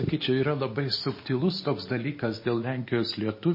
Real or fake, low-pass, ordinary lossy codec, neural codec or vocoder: real; 5.4 kHz; MP3, 24 kbps; none